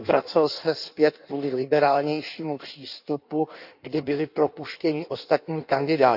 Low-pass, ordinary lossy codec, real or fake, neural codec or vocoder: 5.4 kHz; none; fake; codec, 16 kHz in and 24 kHz out, 1.1 kbps, FireRedTTS-2 codec